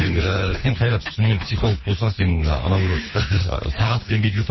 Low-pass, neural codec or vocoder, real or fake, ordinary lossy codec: 7.2 kHz; codec, 24 kHz, 3 kbps, HILCodec; fake; MP3, 24 kbps